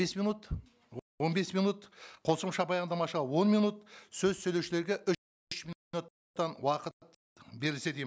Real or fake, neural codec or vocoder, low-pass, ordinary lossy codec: real; none; none; none